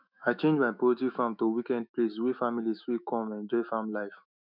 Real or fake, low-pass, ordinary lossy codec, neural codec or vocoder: fake; 5.4 kHz; none; autoencoder, 48 kHz, 128 numbers a frame, DAC-VAE, trained on Japanese speech